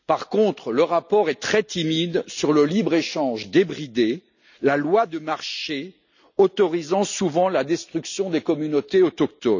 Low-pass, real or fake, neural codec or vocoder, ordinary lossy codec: 7.2 kHz; real; none; none